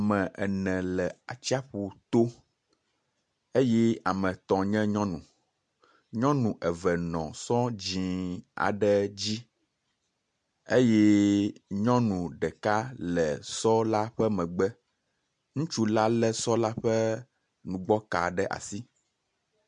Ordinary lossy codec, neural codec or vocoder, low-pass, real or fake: MP3, 64 kbps; none; 9.9 kHz; real